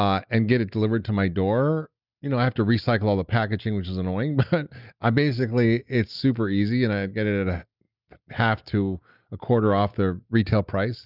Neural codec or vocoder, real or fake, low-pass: none; real; 5.4 kHz